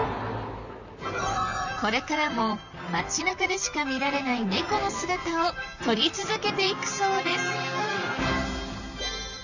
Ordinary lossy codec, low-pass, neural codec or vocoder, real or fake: none; 7.2 kHz; vocoder, 44.1 kHz, 128 mel bands, Pupu-Vocoder; fake